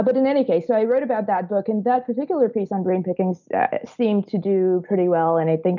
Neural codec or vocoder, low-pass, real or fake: none; 7.2 kHz; real